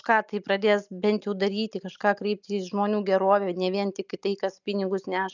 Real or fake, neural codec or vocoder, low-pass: real; none; 7.2 kHz